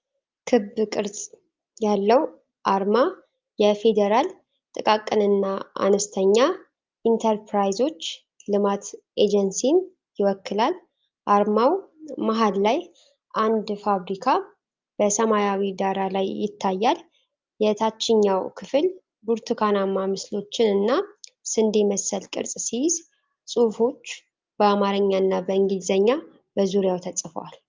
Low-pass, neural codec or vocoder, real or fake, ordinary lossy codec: 7.2 kHz; none; real; Opus, 24 kbps